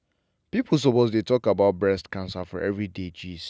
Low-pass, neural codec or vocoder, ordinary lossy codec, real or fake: none; none; none; real